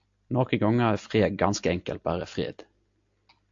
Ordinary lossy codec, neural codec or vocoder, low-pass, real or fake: AAC, 64 kbps; none; 7.2 kHz; real